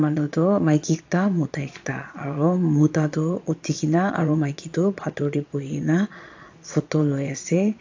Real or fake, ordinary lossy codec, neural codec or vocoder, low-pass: fake; AAC, 48 kbps; vocoder, 22.05 kHz, 80 mel bands, Vocos; 7.2 kHz